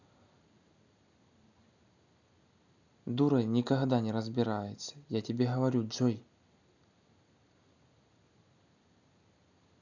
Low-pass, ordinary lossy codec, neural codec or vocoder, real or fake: 7.2 kHz; none; none; real